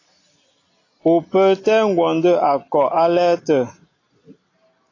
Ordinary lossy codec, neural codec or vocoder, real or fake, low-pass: AAC, 32 kbps; none; real; 7.2 kHz